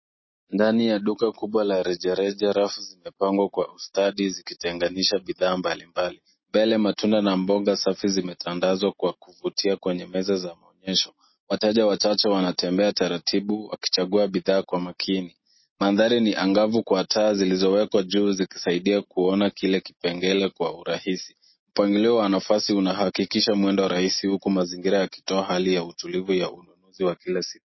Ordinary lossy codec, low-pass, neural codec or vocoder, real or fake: MP3, 24 kbps; 7.2 kHz; none; real